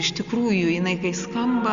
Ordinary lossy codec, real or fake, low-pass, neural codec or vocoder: Opus, 64 kbps; real; 7.2 kHz; none